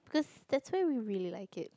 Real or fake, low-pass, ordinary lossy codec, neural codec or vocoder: real; none; none; none